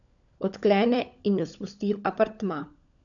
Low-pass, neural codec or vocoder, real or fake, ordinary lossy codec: 7.2 kHz; codec, 16 kHz, 16 kbps, FunCodec, trained on LibriTTS, 50 frames a second; fake; none